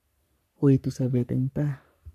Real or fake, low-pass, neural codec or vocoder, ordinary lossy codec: fake; 14.4 kHz; codec, 44.1 kHz, 3.4 kbps, Pupu-Codec; none